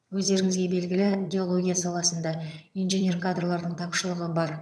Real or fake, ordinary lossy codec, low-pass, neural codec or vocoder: fake; none; none; vocoder, 22.05 kHz, 80 mel bands, HiFi-GAN